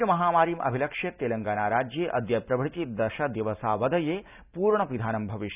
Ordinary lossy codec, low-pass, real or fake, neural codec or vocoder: none; 3.6 kHz; real; none